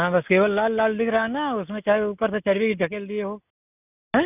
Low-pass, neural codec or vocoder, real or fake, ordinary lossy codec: 3.6 kHz; none; real; none